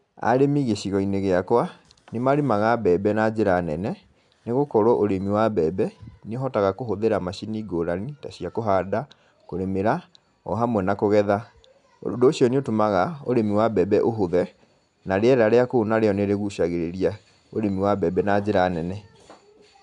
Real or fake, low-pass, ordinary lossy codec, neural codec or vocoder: real; 10.8 kHz; none; none